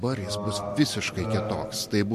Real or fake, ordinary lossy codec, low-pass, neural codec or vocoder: real; MP3, 64 kbps; 14.4 kHz; none